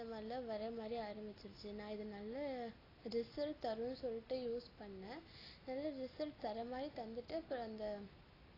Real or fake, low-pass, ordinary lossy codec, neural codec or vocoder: real; 5.4 kHz; AAC, 24 kbps; none